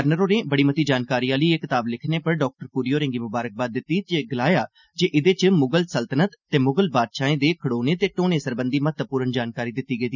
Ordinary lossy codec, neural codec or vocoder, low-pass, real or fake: none; none; 7.2 kHz; real